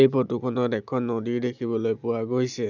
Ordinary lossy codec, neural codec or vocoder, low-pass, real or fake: none; none; 7.2 kHz; real